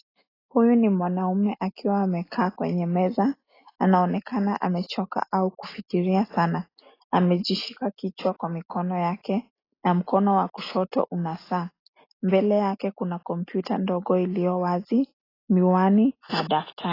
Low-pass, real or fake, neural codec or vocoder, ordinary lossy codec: 5.4 kHz; real; none; AAC, 24 kbps